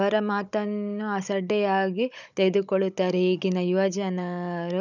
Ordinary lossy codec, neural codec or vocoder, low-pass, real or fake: none; codec, 16 kHz, 16 kbps, FunCodec, trained on Chinese and English, 50 frames a second; 7.2 kHz; fake